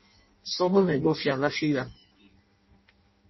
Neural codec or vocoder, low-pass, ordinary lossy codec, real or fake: codec, 16 kHz in and 24 kHz out, 0.6 kbps, FireRedTTS-2 codec; 7.2 kHz; MP3, 24 kbps; fake